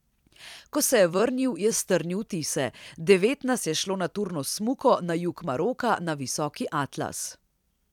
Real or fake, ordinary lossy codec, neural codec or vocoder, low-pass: fake; none; vocoder, 44.1 kHz, 128 mel bands every 256 samples, BigVGAN v2; 19.8 kHz